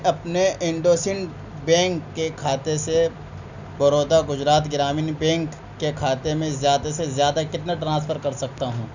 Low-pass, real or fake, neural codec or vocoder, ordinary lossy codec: 7.2 kHz; real; none; none